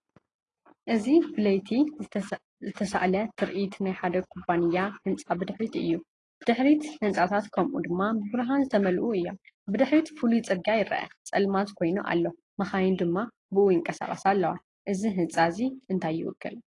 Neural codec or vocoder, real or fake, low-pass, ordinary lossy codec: none; real; 10.8 kHz; AAC, 32 kbps